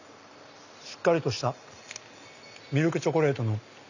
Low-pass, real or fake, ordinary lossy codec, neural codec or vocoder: 7.2 kHz; real; none; none